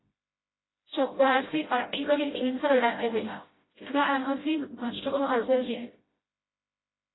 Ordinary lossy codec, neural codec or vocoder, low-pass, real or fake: AAC, 16 kbps; codec, 16 kHz, 0.5 kbps, FreqCodec, smaller model; 7.2 kHz; fake